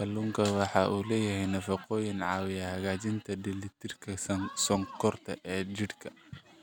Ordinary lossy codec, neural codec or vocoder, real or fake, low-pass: none; none; real; none